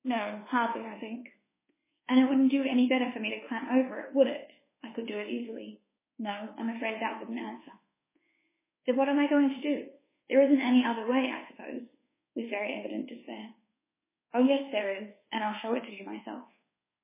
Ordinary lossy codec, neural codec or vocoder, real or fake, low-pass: MP3, 16 kbps; codec, 24 kHz, 1.2 kbps, DualCodec; fake; 3.6 kHz